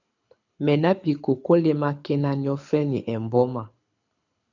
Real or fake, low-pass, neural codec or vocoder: fake; 7.2 kHz; codec, 24 kHz, 6 kbps, HILCodec